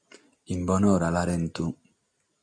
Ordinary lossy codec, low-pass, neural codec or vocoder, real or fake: MP3, 96 kbps; 9.9 kHz; none; real